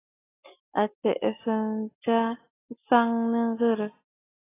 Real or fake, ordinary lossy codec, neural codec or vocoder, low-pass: real; AAC, 16 kbps; none; 3.6 kHz